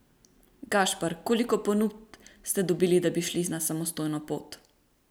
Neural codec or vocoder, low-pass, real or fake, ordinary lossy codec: none; none; real; none